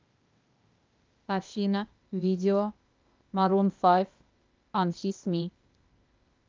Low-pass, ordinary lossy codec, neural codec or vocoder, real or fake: 7.2 kHz; Opus, 24 kbps; codec, 16 kHz, 0.8 kbps, ZipCodec; fake